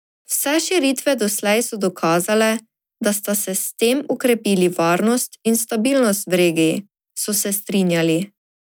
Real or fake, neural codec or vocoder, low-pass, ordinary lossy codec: real; none; none; none